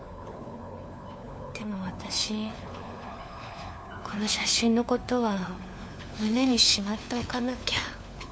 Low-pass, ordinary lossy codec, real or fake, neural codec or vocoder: none; none; fake; codec, 16 kHz, 4 kbps, FunCodec, trained on LibriTTS, 50 frames a second